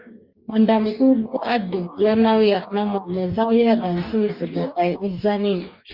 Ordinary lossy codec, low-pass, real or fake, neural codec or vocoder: Opus, 64 kbps; 5.4 kHz; fake; codec, 44.1 kHz, 2.6 kbps, DAC